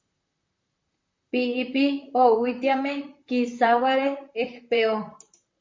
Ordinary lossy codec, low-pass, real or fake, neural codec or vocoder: MP3, 48 kbps; 7.2 kHz; fake; vocoder, 44.1 kHz, 128 mel bands, Pupu-Vocoder